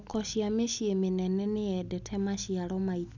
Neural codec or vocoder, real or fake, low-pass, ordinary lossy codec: none; real; 7.2 kHz; none